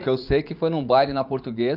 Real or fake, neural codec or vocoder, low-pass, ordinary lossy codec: fake; vocoder, 44.1 kHz, 80 mel bands, Vocos; 5.4 kHz; none